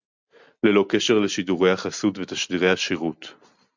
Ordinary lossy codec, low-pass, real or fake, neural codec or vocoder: MP3, 64 kbps; 7.2 kHz; real; none